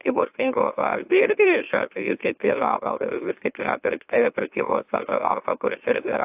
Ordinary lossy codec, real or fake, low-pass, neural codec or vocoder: AAC, 32 kbps; fake; 3.6 kHz; autoencoder, 44.1 kHz, a latent of 192 numbers a frame, MeloTTS